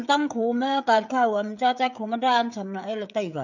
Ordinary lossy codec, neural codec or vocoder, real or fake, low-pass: none; codec, 16 kHz, 16 kbps, FreqCodec, smaller model; fake; 7.2 kHz